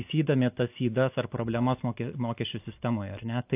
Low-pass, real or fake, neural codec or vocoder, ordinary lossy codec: 3.6 kHz; real; none; AAC, 32 kbps